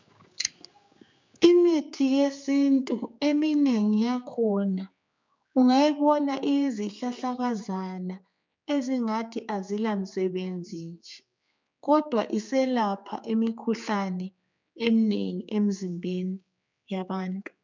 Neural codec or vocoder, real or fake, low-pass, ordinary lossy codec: codec, 16 kHz, 4 kbps, X-Codec, HuBERT features, trained on general audio; fake; 7.2 kHz; MP3, 64 kbps